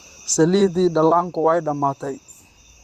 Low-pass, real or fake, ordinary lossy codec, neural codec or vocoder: 14.4 kHz; fake; Opus, 64 kbps; vocoder, 44.1 kHz, 128 mel bands, Pupu-Vocoder